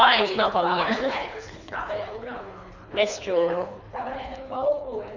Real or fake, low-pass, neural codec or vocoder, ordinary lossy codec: fake; 7.2 kHz; codec, 24 kHz, 3 kbps, HILCodec; none